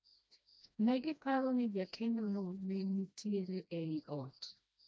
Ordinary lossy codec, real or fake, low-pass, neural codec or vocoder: none; fake; none; codec, 16 kHz, 1 kbps, FreqCodec, smaller model